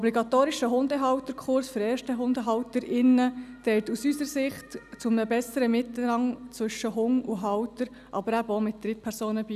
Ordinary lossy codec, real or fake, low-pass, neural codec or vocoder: none; real; 14.4 kHz; none